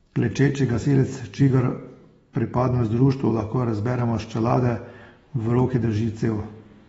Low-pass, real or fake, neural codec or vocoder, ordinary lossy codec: 19.8 kHz; fake; autoencoder, 48 kHz, 128 numbers a frame, DAC-VAE, trained on Japanese speech; AAC, 24 kbps